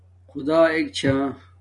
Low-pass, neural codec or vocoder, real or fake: 10.8 kHz; none; real